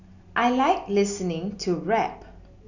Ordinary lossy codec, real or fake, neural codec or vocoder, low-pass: none; real; none; 7.2 kHz